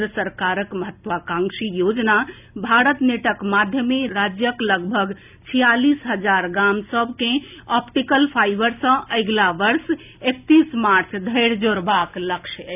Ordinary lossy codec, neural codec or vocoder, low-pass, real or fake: none; none; 3.6 kHz; real